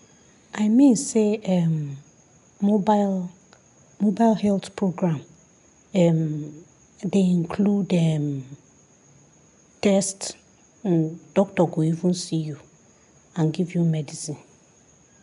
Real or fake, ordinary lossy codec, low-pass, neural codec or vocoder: real; none; 10.8 kHz; none